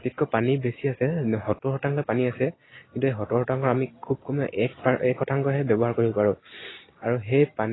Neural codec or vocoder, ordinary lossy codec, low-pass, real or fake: none; AAC, 16 kbps; 7.2 kHz; real